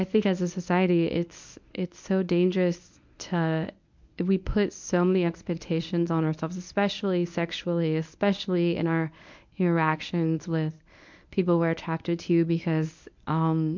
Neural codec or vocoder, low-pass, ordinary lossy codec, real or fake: codec, 24 kHz, 0.9 kbps, WavTokenizer, medium speech release version 1; 7.2 kHz; MP3, 64 kbps; fake